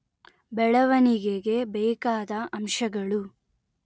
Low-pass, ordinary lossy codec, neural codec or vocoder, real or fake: none; none; none; real